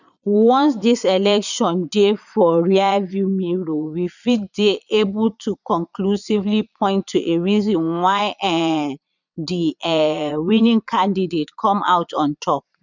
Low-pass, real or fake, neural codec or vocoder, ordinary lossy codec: 7.2 kHz; fake; vocoder, 22.05 kHz, 80 mel bands, Vocos; none